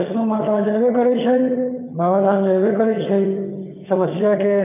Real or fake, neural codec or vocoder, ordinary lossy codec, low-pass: fake; vocoder, 22.05 kHz, 80 mel bands, HiFi-GAN; none; 3.6 kHz